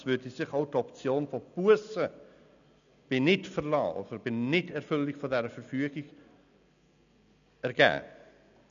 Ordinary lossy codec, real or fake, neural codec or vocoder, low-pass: none; real; none; 7.2 kHz